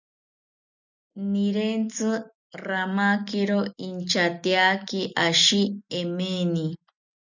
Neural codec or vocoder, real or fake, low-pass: none; real; 7.2 kHz